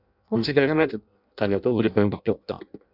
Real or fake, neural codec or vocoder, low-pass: fake; codec, 16 kHz in and 24 kHz out, 0.6 kbps, FireRedTTS-2 codec; 5.4 kHz